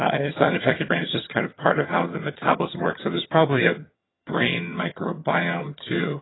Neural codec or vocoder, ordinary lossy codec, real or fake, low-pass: vocoder, 22.05 kHz, 80 mel bands, HiFi-GAN; AAC, 16 kbps; fake; 7.2 kHz